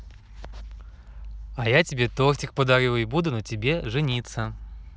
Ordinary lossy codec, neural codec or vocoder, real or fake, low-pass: none; none; real; none